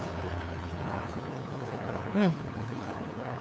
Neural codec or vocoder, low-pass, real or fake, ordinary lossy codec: codec, 16 kHz, 2 kbps, FunCodec, trained on LibriTTS, 25 frames a second; none; fake; none